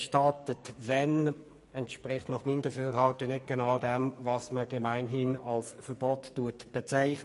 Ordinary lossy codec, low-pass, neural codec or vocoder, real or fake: MP3, 48 kbps; 14.4 kHz; codec, 44.1 kHz, 2.6 kbps, SNAC; fake